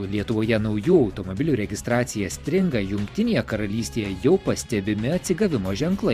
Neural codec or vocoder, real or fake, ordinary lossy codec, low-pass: vocoder, 44.1 kHz, 128 mel bands every 512 samples, BigVGAN v2; fake; Opus, 64 kbps; 14.4 kHz